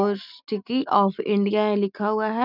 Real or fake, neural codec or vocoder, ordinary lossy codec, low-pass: real; none; none; 5.4 kHz